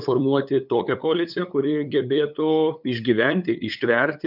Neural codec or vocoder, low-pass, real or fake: codec, 16 kHz, 8 kbps, FunCodec, trained on LibriTTS, 25 frames a second; 5.4 kHz; fake